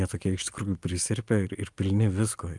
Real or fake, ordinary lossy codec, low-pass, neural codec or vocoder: real; Opus, 32 kbps; 10.8 kHz; none